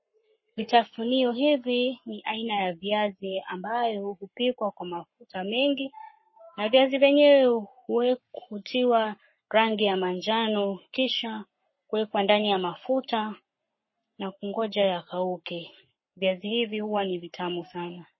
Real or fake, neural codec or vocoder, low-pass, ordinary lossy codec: fake; codec, 44.1 kHz, 7.8 kbps, Pupu-Codec; 7.2 kHz; MP3, 24 kbps